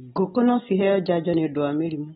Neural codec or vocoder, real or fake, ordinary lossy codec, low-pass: none; real; AAC, 16 kbps; 7.2 kHz